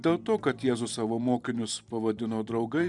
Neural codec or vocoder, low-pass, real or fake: vocoder, 44.1 kHz, 128 mel bands every 512 samples, BigVGAN v2; 10.8 kHz; fake